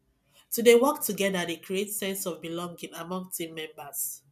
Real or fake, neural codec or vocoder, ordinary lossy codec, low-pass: real; none; none; 14.4 kHz